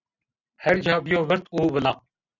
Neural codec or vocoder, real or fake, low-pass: none; real; 7.2 kHz